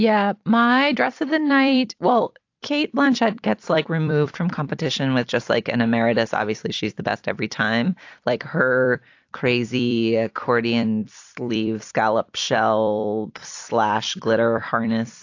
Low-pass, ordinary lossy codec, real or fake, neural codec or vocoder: 7.2 kHz; AAC, 48 kbps; fake; vocoder, 44.1 kHz, 128 mel bands every 256 samples, BigVGAN v2